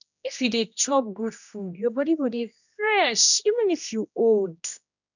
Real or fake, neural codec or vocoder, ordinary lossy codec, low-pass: fake; codec, 16 kHz, 1 kbps, X-Codec, HuBERT features, trained on general audio; none; 7.2 kHz